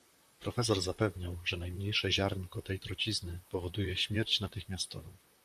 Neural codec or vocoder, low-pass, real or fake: vocoder, 44.1 kHz, 128 mel bands, Pupu-Vocoder; 14.4 kHz; fake